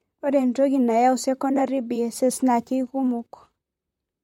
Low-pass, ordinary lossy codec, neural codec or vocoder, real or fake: 19.8 kHz; MP3, 64 kbps; vocoder, 44.1 kHz, 128 mel bands, Pupu-Vocoder; fake